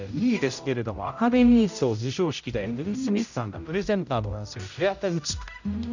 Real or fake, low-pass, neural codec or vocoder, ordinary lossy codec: fake; 7.2 kHz; codec, 16 kHz, 0.5 kbps, X-Codec, HuBERT features, trained on general audio; none